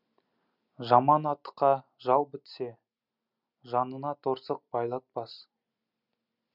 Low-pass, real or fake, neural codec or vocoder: 5.4 kHz; real; none